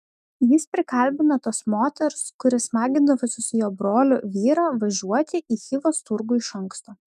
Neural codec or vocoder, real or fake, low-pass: autoencoder, 48 kHz, 128 numbers a frame, DAC-VAE, trained on Japanese speech; fake; 14.4 kHz